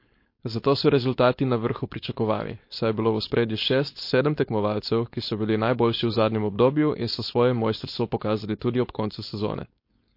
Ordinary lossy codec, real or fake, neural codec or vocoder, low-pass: MP3, 32 kbps; fake; codec, 16 kHz, 4.8 kbps, FACodec; 5.4 kHz